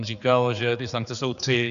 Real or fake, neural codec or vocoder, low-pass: fake; codec, 16 kHz, 4 kbps, X-Codec, HuBERT features, trained on general audio; 7.2 kHz